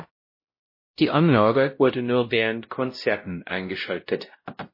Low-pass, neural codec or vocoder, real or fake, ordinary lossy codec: 5.4 kHz; codec, 16 kHz, 0.5 kbps, X-Codec, WavLM features, trained on Multilingual LibriSpeech; fake; MP3, 24 kbps